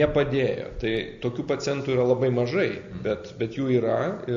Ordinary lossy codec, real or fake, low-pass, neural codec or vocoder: AAC, 64 kbps; real; 7.2 kHz; none